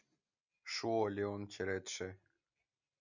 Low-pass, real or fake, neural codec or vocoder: 7.2 kHz; real; none